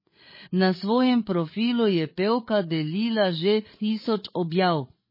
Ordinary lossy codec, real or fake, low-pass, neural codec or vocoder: MP3, 24 kbps; fake; 5.4 kHz; codec, 16 kHz, 8 kbps, FreqCodec, larger model